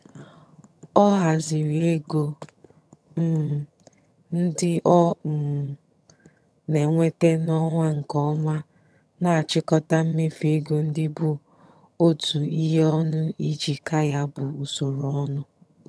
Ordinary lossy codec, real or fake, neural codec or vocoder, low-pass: none; fake; vocoder, 22.05 kHz, 80 mel bands, HiFi-GAN; none